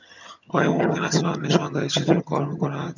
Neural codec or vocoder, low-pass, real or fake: vocoder, 22.05 kHz, 80 mel bands, HiFi-GAN; 7.2 kHz; fake